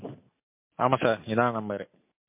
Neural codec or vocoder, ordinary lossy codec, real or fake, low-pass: none; MP3, 24 kbps; real; 3.6 kHz